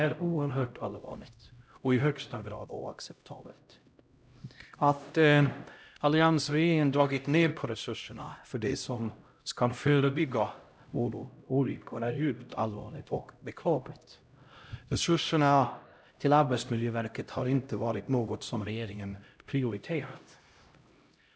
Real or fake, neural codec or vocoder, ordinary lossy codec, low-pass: fake; codec, 16 kHz, 0.5 kbps, X-Codec, HuBERT features, trained on LibriSpeech; none; none